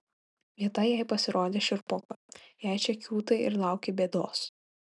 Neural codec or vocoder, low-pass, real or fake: none; 10.8 kHz; real